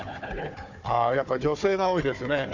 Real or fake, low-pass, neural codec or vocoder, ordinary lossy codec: fake; 7.2 kHz; codec, 16 kHz, 4 kbps, FunCodec, trained on Chinese and English, 50 frames a second; none